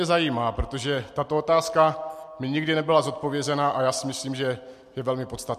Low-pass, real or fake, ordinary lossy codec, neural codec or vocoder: 14.4 kHz; real; MP3, 64 kbps; none